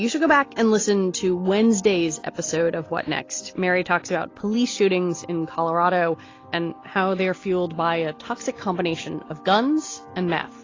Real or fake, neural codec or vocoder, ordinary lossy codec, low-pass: real; none; AAC, 32 kbps; 7.2 kHz